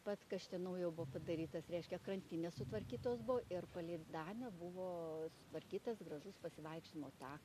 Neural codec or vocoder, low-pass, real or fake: none; 14.4 kHz; real